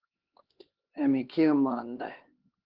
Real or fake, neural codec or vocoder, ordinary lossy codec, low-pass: fake; codec, 16 kHz, 2 kbps, X-Codec, HuBERT features, trained on LibriSpeech; Opus, 16 kbps; 5.4 kHz